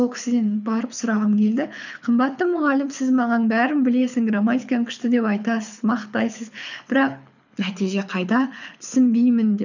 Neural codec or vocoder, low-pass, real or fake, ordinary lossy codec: codec, 24 kHz, 6 kbps, HILCodec; 7.2 kHz; fake; none